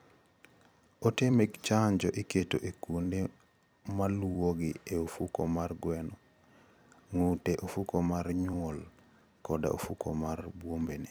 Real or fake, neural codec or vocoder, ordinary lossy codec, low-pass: fake; vocoder, 44.1 kHz, 128 mel bands every 256 samples, BigVGAN v2; none; none